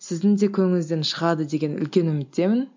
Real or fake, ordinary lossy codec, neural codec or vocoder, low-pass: real; MP3, 64 kbps; none; 7.2 kHz